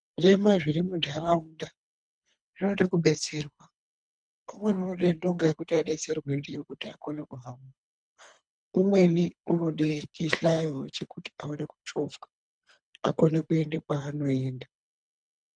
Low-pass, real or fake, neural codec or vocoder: 9.9 kHz; fake; codec, 24 kHz, 3 kbps, HILCodec